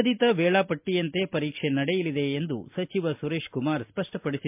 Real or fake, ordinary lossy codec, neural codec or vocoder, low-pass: real; MP3, 32 kbps; none; 3.6 kHz